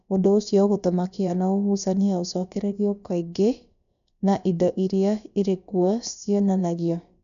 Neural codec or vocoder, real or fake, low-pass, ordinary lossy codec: codec, 16 kHz, about 1 kbps, DyCAST, with the encoder's durations; fake; 7.2 kHz; none